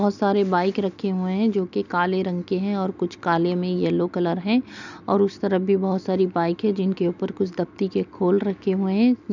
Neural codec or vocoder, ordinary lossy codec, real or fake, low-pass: none; none; real; 7.2 kHz